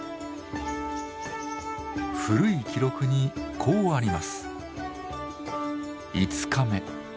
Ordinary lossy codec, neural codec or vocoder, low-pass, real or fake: none; none; none; real